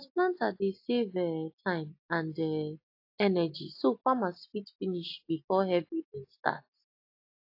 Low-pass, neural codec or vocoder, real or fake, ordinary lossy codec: 5.4 kHz; none; real; AAC, 32 kbps